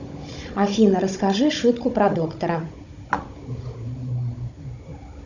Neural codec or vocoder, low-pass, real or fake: codec, 16 kHz, 16 kbps, FunCodec, trained on Chinese and English, 50 frames a second; 7.2 kHz; fake